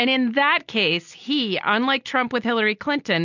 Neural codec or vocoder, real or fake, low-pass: none; real; 7.2 kHz